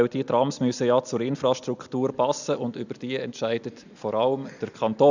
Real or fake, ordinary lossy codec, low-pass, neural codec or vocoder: real; none; 7.2 kHz; none